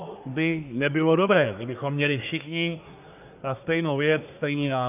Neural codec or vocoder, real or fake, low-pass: codec, 24 kHz, 1 kbps, SNAC; fake; 3.6 kHz